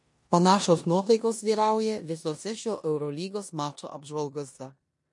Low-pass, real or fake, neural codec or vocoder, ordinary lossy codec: 10.8 kHz; fake; codec, 16 kHz in and 24 kHz out, 0.9 kbps, LongCat-Audio-Codec, four codebook decoder; MP3, 48 kbps